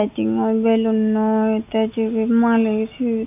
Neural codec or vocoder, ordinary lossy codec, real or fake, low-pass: none; none; real; 3.6 kHz